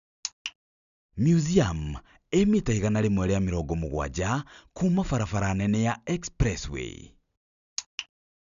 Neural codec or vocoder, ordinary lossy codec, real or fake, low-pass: none; none; real; 7.2 kHz